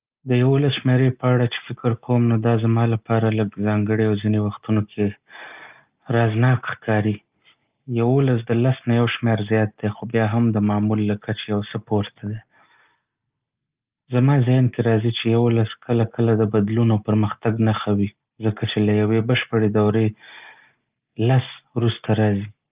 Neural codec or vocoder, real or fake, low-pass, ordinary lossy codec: none; real; 3.6 kHz; Opus, 24 kbps